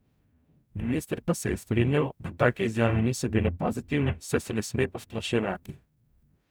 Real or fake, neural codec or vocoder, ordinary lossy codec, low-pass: fake; codec, 44.1 kHz, 0.9 kbps, DAC; none; none